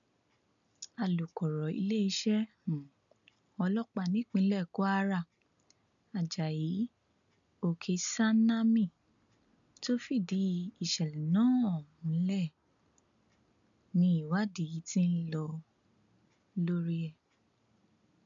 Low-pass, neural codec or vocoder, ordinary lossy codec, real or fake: 7.2 kHz; none; none; real